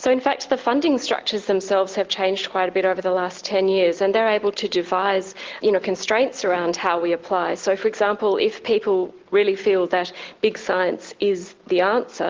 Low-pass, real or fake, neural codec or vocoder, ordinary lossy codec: 7.2 kHz; real; none; Opus, 16 kbps